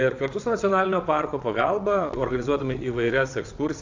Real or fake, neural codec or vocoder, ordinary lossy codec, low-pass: fake; vocoder, 22.05 kHz, 80 mel bands, WaveNeXt; AAC, 48 kbps; 7.2 kHz